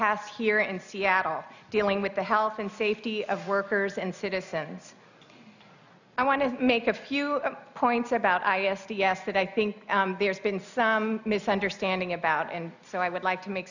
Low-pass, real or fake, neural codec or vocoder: 7.2 kHz; real; none